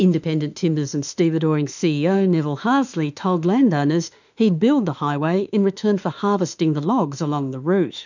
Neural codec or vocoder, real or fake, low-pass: autoencoder, 48 kHz, 32 numbers a frame, DAC-VAE, trained on Japanese speech; fake; 7.2 kHz